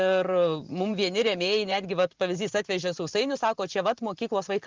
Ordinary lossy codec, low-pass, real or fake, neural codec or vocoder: Opus, 24 kbps; 7.2 kHz; fake; vocoder, 44.1 kHz, 128 mel bands every 512 samples, BigVGAN v2